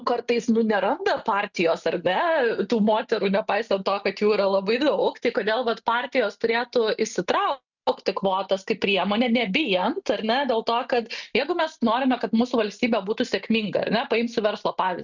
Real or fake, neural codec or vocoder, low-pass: real; none; 7.2 kHz